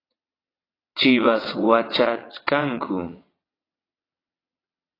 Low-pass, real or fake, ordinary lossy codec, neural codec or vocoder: 5.4 kHz; fake; AAC, 24 kbps; vocoder, 22.05 kHz, 80 mel bands, WaveNeXt